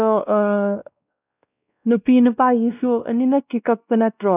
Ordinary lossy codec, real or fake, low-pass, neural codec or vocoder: none; fake; 3.6 kHz; codec, 16 kHz, 1 kbps, X-Codec, WavLM features, trained on Multilingual LibriSpeech